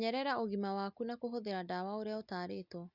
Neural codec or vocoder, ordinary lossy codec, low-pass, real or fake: none; Opus, 64 kbps; 5.4 kHz; real